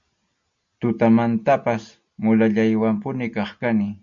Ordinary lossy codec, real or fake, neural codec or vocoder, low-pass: MP3, 64 kbps; real; none; 7.2 kHz